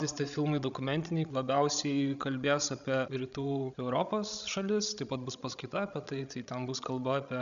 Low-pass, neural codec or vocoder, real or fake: 7.2 kHz; codec, 16 kHz, 16 kbps, FreqCodec, larger model; fake